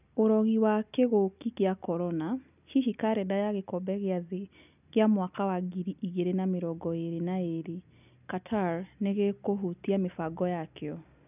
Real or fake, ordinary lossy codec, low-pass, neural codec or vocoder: real; none; 3.6 kHz; none